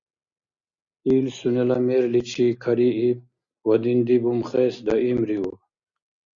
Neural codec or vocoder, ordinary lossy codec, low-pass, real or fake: none; Opus, 64 kbps; 7.2 kHz; real